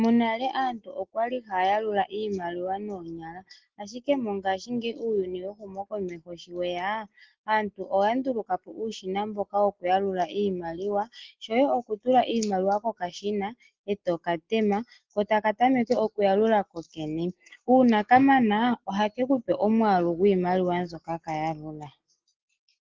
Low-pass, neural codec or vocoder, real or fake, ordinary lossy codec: 7.2 kHz; none; real; Opus, 16 kbps